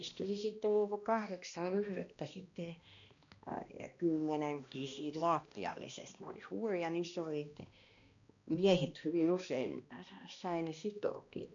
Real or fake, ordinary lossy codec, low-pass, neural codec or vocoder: fake; MP3, 96 kbps; 7.2 kHz; codec, 16 kHz, 1 kbps, X-Codec, HuBERT features, trained on balanced general audio